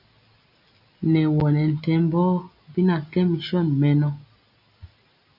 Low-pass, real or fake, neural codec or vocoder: 5.4 kHz; real; none